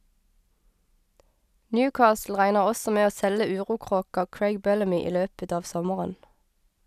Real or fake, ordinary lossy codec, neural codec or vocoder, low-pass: real; none; none; 14.4 kHz